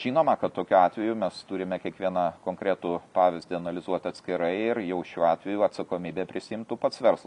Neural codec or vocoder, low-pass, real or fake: none; 10.8 kHz; real